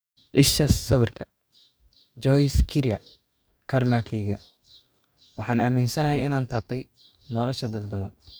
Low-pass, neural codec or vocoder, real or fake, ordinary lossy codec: none; codec, 44.1 kHz, 2.6 kbps, DAC; fake; none